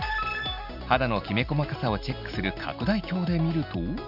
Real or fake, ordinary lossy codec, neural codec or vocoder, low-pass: real; none; none; 5.4 kHz